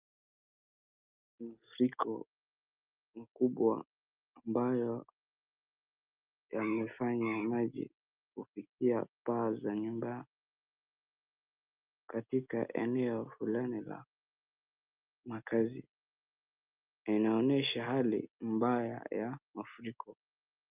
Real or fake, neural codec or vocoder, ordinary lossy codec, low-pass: real; none; Opus, 24 kbps; 3.6 kHz